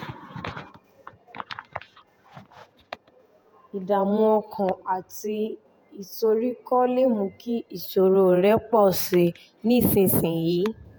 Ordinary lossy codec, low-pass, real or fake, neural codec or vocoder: none; none; fake; vocoder, 48 kHz, 128 mel bands, Vocos